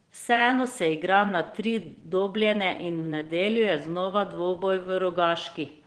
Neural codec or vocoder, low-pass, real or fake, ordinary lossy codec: vocoder, 22.05 kHz, 80 mel bands, Vocos; 9.9 kHz; fake; Opus, 16 kbps